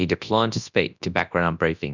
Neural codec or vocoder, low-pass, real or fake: codec, 24 kHz, 0.9 kbps, WavTokenizer, large speech release; 7.2 kHz; fake